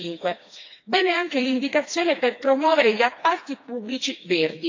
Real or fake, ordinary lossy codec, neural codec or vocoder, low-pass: fake; AAC, 48 kbps; codec, 16 kHz, 2 kbps, FreqCodec, smaller model; 7.2 kHz